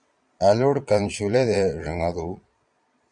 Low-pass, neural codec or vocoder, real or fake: 9.9 kHz; vocoder, 22.05 kHz, 80 mel bands, Vocos; fake